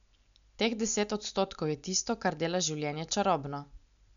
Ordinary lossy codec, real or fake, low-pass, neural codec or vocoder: none; real; 7.2 kHz; none